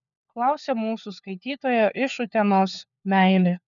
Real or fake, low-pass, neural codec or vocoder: fake; 7.2 kHz; codec, 16 kHz, 4 kbps, FunCodec, trained on LibriTTS, 50 frames a second